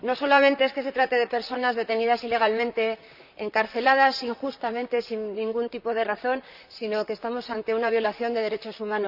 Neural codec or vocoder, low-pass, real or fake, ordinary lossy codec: vocoder, 44.1 kHz, 128 mel bands, Pupu-Vocoder; 5.4 kHz; fake; none